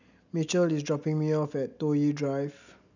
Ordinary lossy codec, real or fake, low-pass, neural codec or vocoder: none; real; 7.2 kHz; none